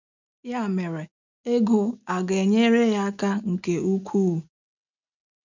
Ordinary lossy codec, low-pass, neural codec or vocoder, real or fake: none; 7.2 kHz; none; real